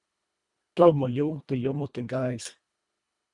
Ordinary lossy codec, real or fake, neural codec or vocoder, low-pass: Opus, 64 kbps; fake; codec, 24 kHz, 1.5 kbps, HILCodec; 10.8 kHz